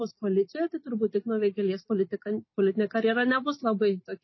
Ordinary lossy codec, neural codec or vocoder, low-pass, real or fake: MP3, 24 kbps; none; 7.2 kHz; real